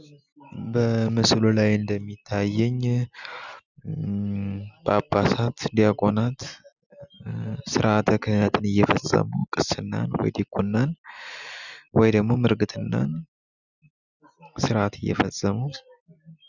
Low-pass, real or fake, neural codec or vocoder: 7.2 kHz; real; none